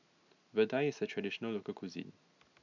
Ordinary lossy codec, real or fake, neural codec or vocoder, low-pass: none; real; none; 7.2 kHz